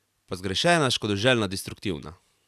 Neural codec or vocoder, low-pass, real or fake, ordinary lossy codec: none; 14.4 kHz; real; none